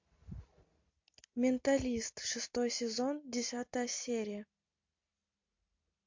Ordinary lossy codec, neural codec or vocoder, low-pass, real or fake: MP3, 48 kbps; none; 7.2 kHz; real